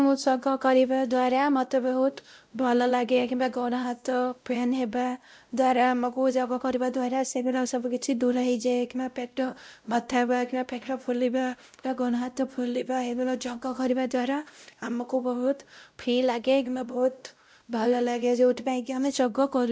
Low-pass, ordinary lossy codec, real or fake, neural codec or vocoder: none; none; fake; codec, 16 kHz, 0.5 kbps, X-Codec, WavLM features, trained on Multilingual LibriSpeech